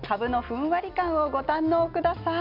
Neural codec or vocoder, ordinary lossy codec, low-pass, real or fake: none; MP3, 48 kbps; 5.4 kHz; real